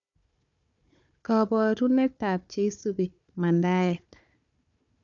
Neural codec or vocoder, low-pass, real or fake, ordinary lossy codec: codec, 16 kHz, 4 kbps, FunCodec, trained on Chinese and English, 50 frames a second; 7.2 kHz; fake; none